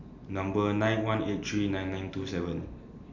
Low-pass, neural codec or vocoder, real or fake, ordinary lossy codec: 7.2 kHz; none; real; none